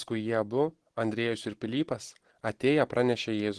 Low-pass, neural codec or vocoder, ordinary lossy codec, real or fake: 10.8 kHz; none; Opus, 16 kbps; real